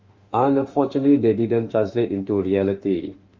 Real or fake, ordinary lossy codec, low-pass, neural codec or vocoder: fake; Opus, 32 kbps; 7.2 kHz; autoencoder, 48 kHz, 32 numbers a frame, DAC-VAE, trained on Japanese speech